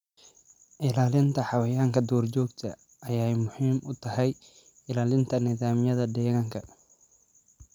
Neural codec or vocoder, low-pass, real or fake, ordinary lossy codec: none; 19.8 kHz; real; none